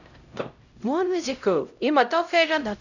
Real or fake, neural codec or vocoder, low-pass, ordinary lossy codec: fake; codec, 16 kHz, 0.5 kbps, X-Codec, HuBERT features, trained on LibriSpeech; 7.2 kHz; none